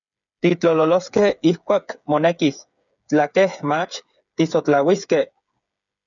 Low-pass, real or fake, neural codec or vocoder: 7.2 kHz; fake; codec, 16 kHz, 8 kbps, FreqCodec, smaller model